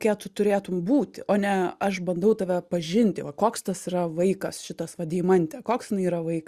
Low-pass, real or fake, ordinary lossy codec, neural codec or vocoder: 14.4 kHz; real; Opus, 64 kbps; none